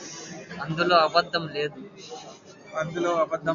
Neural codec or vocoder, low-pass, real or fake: none; 7.2 kHz; real